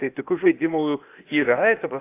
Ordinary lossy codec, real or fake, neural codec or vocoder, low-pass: AAC, 24 kbps; fake; codec, 16 kHz, 0.8 kbps, ZipCodec; 3.6 kHz